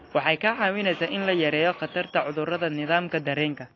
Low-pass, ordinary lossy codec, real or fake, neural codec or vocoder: 7.2 kHz; AAC, 32 kbps; real; none